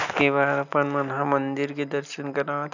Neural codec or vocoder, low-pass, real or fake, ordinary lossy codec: none; 7.2 kHz; real; none